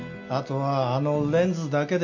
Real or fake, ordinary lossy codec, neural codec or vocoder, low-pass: real; none; none; 7.2 kHz